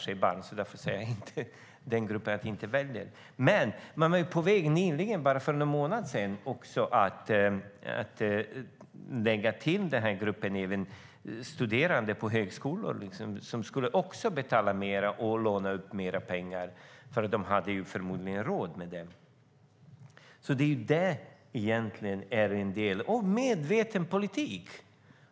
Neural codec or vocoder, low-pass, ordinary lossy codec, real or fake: none; none; none; real